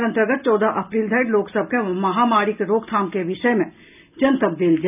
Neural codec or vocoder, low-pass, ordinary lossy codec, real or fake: none; 3.6 kHz; none; real